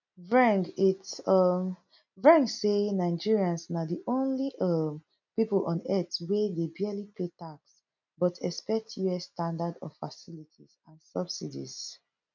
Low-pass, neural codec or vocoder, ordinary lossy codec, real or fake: 7.2 kHz; none; none; real